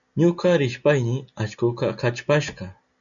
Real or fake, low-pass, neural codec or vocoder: real; 7.2 kHz; none